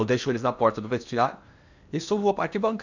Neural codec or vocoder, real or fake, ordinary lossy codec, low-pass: codec, 16 kHz in and 24 kHz out, 0.8 kbps, FocalCodec, streaming, 65536 codes; fake; none; 7.2 kHz